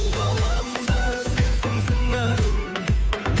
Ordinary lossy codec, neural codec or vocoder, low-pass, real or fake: none; codec, 16 kHz, 2 kbps, FunCodec, trained on Chinese and English, 25 frames a second; none; fake